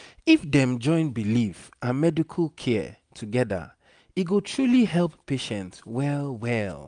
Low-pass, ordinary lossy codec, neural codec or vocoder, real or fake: 9.9 kHz; none; none; real